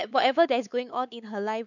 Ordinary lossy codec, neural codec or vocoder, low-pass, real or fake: none; codec, 16 kHz, 4 kbps, X-Codec, WavLM features, trained on Multilingual LibriSpeech; 7.2 kHz; fake